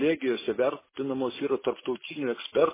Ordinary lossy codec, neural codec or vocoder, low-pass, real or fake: MP3, 16 kbps; none; 3.6 kHz; real